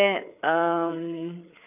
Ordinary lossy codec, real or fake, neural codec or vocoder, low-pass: none; fake; codec, 16 kHz, 4 kbps, FreqCodec, larger model; 3.6 kHz